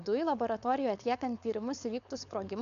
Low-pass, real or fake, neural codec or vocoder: 7.2 kHz; fake; codec, 16 kHz, 4.8 kbps, FACodec